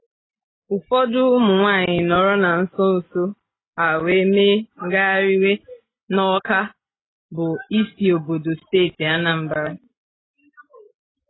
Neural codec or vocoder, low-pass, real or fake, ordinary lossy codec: none; 7.2 kHz; real; AAC, 16 kbps